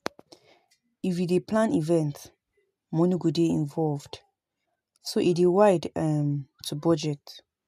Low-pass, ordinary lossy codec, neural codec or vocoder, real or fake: 14.4 kHz; MP3, 96 kbps; none; real